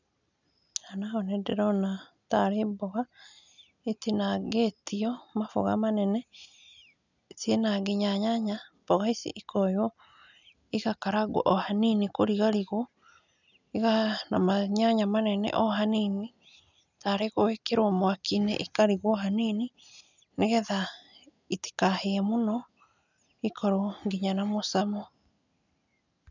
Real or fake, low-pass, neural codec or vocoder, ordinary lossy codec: real; 7.2 kHz; none; none